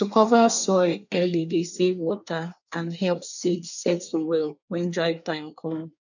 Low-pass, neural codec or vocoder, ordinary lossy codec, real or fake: 7.2 kHz; codec, 24 kHz, 1 kbps, SNAC; none; fake